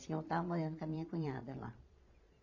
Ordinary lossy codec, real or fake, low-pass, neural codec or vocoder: AAC, 48 kbps; fake; 7.2 kHz; vocoder, 22.05 kHz, 80 mel bands, Vocos